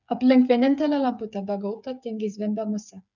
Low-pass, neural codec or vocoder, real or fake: 7.2 kHz; codec, 16 kHz, 8 kbps, FreqCodec, smaller model; fake